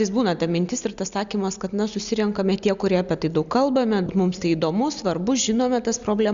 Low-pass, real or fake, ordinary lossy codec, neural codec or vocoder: 7.2 kHz; real; Opus, 64 kbps; none